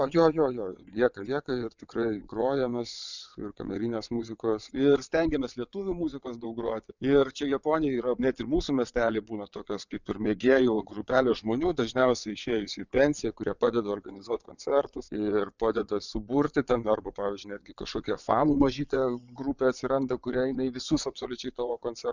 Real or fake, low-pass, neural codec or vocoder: fake; 7.2 kHz; vocoder, 22.05 kHz, 80 mel bands, WaveNeXt